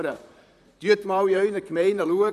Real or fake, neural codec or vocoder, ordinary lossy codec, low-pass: fake; vocoder, 44.1 kHz, 128 mel bands, Pupu-Vocoder; none; 14.4 kHz